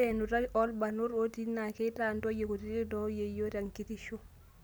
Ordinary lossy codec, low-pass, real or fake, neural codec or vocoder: none; none; real; none